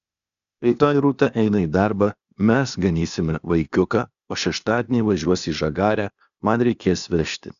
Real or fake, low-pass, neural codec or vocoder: fake; 7.2 kHz; codec, 16 kHz, 0.8 kbps, ZipCodec